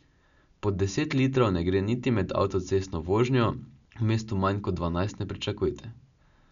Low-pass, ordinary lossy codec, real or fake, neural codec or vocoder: 7.2 kHz; none; real; none